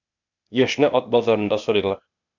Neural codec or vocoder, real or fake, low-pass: codec, 16 kHz, 0.8 kbps, ZipCodec; fake; 7.2 kHz